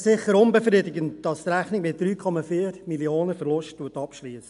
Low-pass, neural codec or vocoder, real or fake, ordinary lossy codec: 10.8 kHz; none; real; AAC, 96 kbps